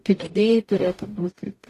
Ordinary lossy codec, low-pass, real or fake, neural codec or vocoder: AAC, 48 kbps; 14.4 kHz; fake; codec, 44.1 kHz, 0.9 kbps, DAC